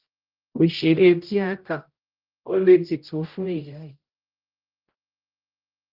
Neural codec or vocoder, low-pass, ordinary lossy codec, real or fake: codec, 16 kHz, 0.5 kbps, X-Codec, HuBERT features, trained on general audio; 5.4 kHz; Opus, 32 kbps; fake